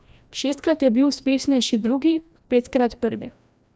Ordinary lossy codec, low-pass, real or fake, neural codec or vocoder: none; none; fake; codec, 16 kHz, 1 kbps, FreqCodec, larger model